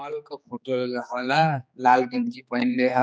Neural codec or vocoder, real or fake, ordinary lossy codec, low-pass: codec, 16 kHz, 2 kbps, X-Codec, HuBERT features, trained on general audio; fake; none; none